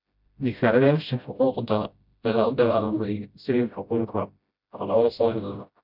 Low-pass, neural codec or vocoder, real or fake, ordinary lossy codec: 5.4 kHz; codec, 16 kHz, 0.5 kbps, FreqCodec, smaller model; fake; none